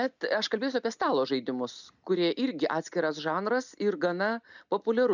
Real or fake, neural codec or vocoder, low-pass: real; none; 7.2 kHz